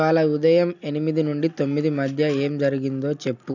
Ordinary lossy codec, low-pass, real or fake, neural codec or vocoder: none; 7.2 kHz; real; none